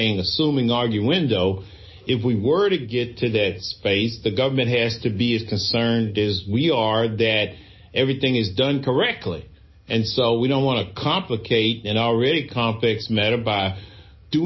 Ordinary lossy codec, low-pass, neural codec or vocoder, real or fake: MP3, 24 kbps; 7.2 kHz; none; real